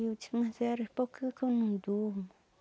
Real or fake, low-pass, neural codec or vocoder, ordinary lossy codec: real; none; none; none